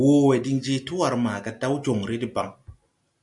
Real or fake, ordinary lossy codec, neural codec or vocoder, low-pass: real; MP3, 96 kbps; none; 10.8 kHz